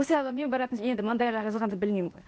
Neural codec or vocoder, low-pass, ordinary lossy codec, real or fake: codec, 16 kHz, 0.8 kbps, ZipCodec; none; none; fake